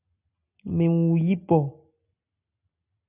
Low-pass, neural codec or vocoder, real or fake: 3.6 kHz; none; real